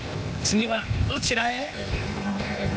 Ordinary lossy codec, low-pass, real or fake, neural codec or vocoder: none; none; fake; codec, 16 kHz, 0.8 kbps, ZipCodec